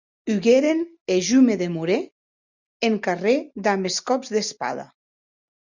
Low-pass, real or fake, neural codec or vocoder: 7.2 kHz; real; none